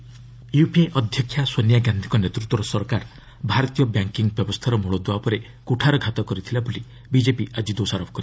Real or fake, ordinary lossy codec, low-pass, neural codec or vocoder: real; none; none; none